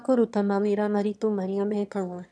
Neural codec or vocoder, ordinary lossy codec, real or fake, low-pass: autoencoder, 22.05 kHz, a latent of 192 numbers a frame, VITS, trained on one speaker; none; fake; none